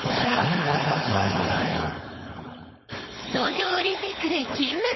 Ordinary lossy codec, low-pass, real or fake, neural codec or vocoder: MP3, 24 kbps; 7.2 kHz; fake; codec, 16 kHz, 4.8 kbps, FACodec